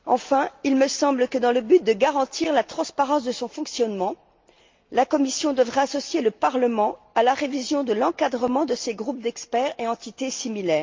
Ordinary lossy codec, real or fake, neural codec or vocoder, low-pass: Opus, 24 kbps; real; none; 7.2 kHz